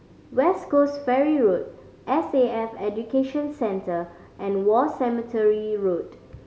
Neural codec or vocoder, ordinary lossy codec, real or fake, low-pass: none; none; real; none